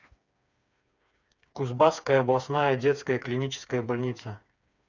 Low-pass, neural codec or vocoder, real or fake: 7.2 kHz; codec, 16 kHz, 4 kbps, FreqCodec, smaller model; fake